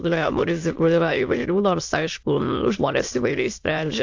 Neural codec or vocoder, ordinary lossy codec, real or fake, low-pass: autoencoder, 22.05 kHz, a latent of 192 numbers a frame, VITS, trained on many speakers; AAC, 48 kbps; fake; 7.2 kHz